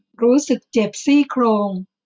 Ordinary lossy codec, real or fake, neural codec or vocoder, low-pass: none; real; none; none